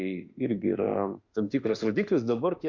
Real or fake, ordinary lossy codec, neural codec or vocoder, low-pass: fake; Opus, 64 kbps; autoencoder, 48 kHz, 32 numbers a frame, DAC-VAE, trained on Japanese speech; 7.2 kHz